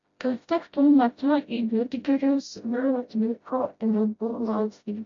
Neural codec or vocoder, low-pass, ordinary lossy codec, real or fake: codec, 16 kHz, 0.5 kbps, FreqCodec, smaller model; 7.2 kHz; MP3, 48 kbps; fake